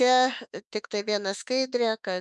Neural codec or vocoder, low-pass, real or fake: autoencoder, 48 kHz, 32 numbers a frame, DAC-VAE, trained on Japanese speech; 10.8 kHz; fake